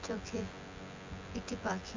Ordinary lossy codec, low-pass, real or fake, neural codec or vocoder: MP3, 64 kbps; 7.2 kHz; fake; vocoder, 24 kHz, 100 mel bands, Vocos